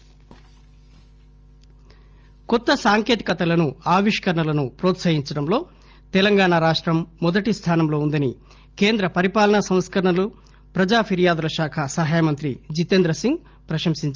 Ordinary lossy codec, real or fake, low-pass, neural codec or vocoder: Opus, 24 kbps; real; 7.2 kHz; none